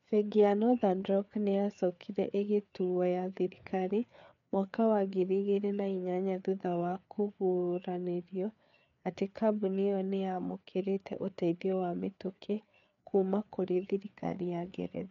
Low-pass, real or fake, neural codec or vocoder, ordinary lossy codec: 7.2 kHz; fake; codec, 16 kHz, 4 kbps, FreqCodec, larger model; MP3, 96 kbps